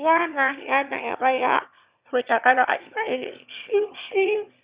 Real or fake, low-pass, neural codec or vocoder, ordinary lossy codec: fake; 3.6 kHz; autoencoder, 22.05 kHz, a latent of 192 numbers a frame, VITS, trained on one speaker; Opus, 64 kbps